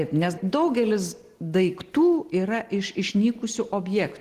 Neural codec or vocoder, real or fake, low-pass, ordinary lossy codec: none; real; 14.4 kHz; Opus, 16 kbps